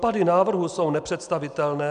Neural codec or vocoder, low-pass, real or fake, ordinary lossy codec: none; 9.9 kHz; real; MP3, 96 kbps